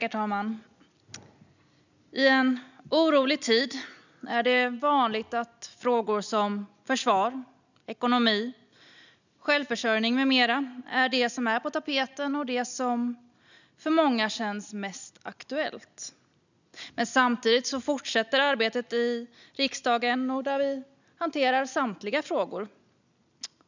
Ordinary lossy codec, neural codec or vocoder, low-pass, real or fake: none; none; 7.2 kHz; real